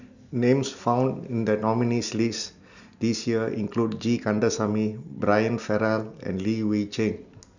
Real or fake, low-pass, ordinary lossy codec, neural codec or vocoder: real; 7.2 kHz; none; none